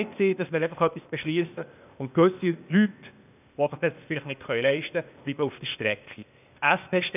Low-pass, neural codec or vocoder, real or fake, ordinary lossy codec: 3.6 kHz; codec, 16 kHz, 0.8 kbps, ZipCodec; fake; none